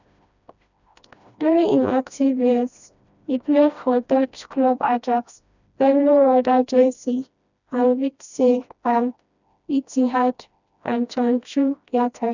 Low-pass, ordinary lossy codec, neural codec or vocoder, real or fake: 7.2 kHz; none; codec, 16 kHz, 1 kbps, FreqCodec, smaller model; fake